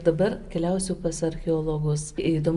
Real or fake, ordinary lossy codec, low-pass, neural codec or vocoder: real; Opus, 64 kbps; 10.8 kHz; none